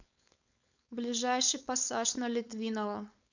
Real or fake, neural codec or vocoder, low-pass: fake; codec, 16 kHz, 4.8 kbps, FACodec; 7.2 kHz